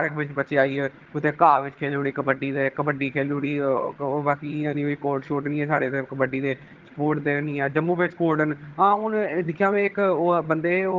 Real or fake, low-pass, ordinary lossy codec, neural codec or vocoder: fake; 7.2 kHz; Opus, 16 kbps; vocoder, 22.05 kHz, 80 mel bands, HiFi-GAN